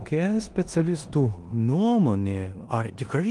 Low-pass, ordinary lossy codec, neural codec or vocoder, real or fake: 10.8 kHz; Opus, 24 kbps; codec, 16 kHz in and 24 kHz out, 0.9 kbps, LongCat-Audio-Codec, four codebook decoder; fake